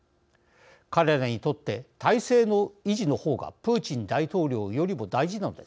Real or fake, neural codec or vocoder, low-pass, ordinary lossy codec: real; none; none; none